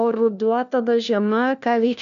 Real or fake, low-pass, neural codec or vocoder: fake; 7.2 kHz; codec, 16 kHz, 1 kbps, FunCodec, trained on LibriTTS, 50 frames a second